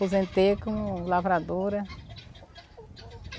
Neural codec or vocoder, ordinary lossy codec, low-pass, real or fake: none; none; none; real